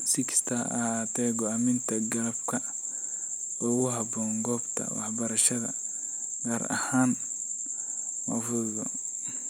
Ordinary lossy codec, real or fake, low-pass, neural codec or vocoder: none; real; none; none